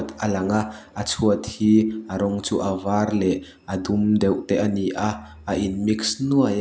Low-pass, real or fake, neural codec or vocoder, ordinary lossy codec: none; real; none; none